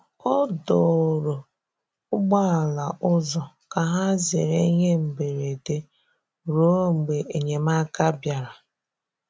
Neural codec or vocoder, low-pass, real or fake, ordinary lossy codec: none; none; real; none